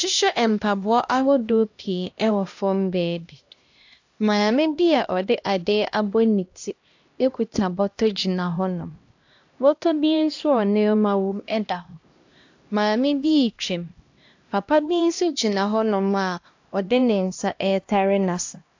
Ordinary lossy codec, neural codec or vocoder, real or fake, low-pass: AAC, 48 kbps; codec, 16 kHz, 1 kbps, X-Codec, HuBERT features, trained on LibriSpeech; fake; 7.2 kHz